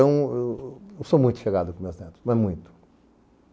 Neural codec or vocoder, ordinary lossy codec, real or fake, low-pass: none; none; real; none